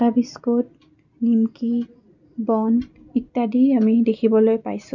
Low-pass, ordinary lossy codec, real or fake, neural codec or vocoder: 7.2 kHz; none; real; none